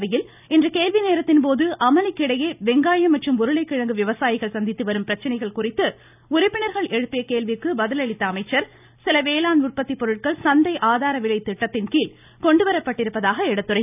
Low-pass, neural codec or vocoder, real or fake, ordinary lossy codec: 3.6 kHz; none; real; none